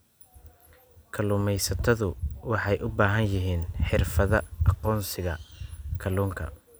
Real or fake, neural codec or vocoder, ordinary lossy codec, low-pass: real; none; none; none